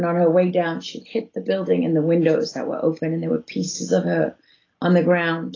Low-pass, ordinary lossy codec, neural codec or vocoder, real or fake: 7.2 kHz; AAC, 32 kbps; none; real